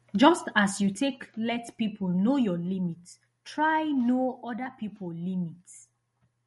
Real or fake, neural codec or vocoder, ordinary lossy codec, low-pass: real; none; MP3, 48 kbps; 14.4 kHz